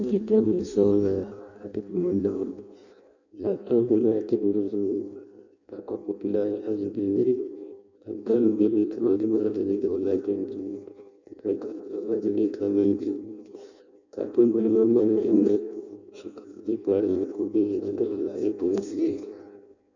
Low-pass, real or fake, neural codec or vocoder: 7.2 kHz; fake; codec, 16 kHz in and 24 kHz out, 0.6 kbps, FireRedTTS-2 codec